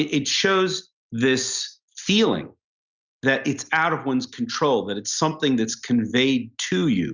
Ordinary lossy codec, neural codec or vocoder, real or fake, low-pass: Opus, 64 kbps; none; real; 7.2 kHz